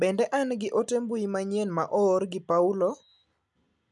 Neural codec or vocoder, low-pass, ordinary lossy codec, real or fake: none; none; none; real